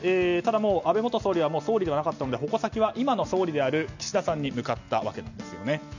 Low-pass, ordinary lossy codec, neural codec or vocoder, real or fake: 7.2 kHz; none; none; real